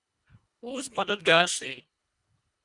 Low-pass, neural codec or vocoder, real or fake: 10.8 kHz; codec, 24 kHz, 1.5 kbps, HILCodec; fake